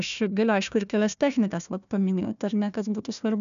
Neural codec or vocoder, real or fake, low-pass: codec, 16 kHz, 1 kbps, FunCodec, trained on Chinese and English, 50 frames a second; fake; 7.2 kHz